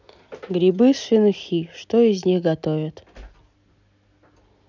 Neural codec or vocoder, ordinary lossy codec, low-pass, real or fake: none; none; 7.2 kHz; real